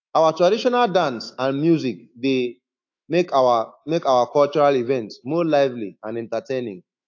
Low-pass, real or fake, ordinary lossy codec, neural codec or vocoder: 7.2 kHz; fake; AAC, 48 kbps; autoencoder, 48 kHz, 128 numbers a frame, DAC-VAE, trained on Japanese speech